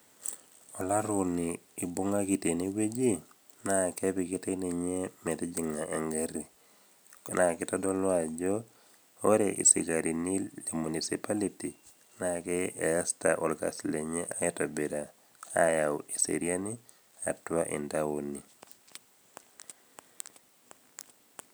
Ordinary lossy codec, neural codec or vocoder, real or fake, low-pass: none; none; real; none